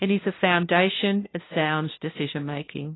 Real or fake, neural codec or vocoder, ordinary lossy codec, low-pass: fake; codec, 16 kHz, 0.5 kbps, FunCodec, trained on LibriTTS, 25 frames a second; AAC, 16 kbps; 7.2 kHz